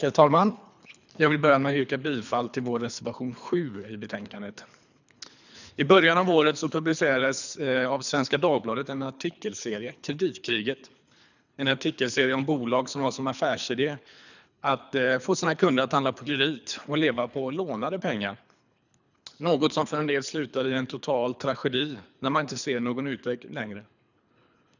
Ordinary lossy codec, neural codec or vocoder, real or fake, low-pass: none; codec, 24 kHz, 3 kbps, HILCodec; fake; 7.2 kHz